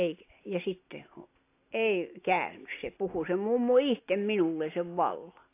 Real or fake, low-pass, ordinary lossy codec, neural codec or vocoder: real; 3.6 kHz; AAC, 24 kbps; none